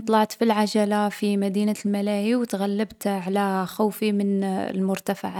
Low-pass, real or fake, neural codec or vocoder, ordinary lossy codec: 19.8 kHz; real; none; none